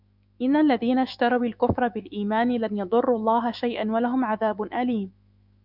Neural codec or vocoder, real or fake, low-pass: autoencoder, 48 kHz, 128 numbers a frame, DAC-VAE, trained on Japanese speech; fake; 5.4 kHz